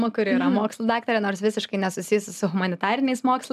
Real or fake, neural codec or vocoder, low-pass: real; none; 14.4 kHz